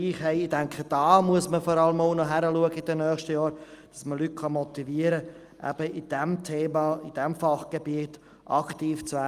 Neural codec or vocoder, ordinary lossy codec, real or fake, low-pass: none; Opus, 32 kbps; real; 14.4 kHz